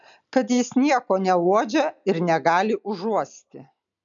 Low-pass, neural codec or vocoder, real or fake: 7.2 kHz; none; real